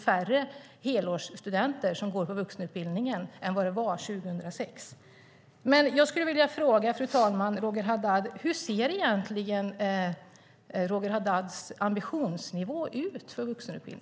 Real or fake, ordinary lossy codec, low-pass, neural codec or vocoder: real; none; none; none